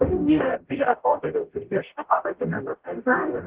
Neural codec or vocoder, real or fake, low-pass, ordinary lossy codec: codec, 44.1 kHz, 0.9 kbps, DAC; fake; 3.6 kHz; Opus, 16 kbps